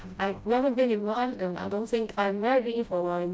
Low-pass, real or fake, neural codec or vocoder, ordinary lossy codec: none; fake; codec, 16 kHz, 0.5 kbps, FreqCodec, smaller model; none